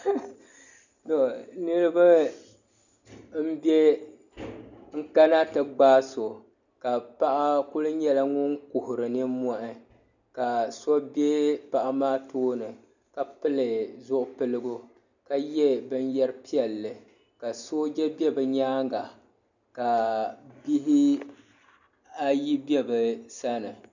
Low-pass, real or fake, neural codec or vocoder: 7.2 kHz; real; none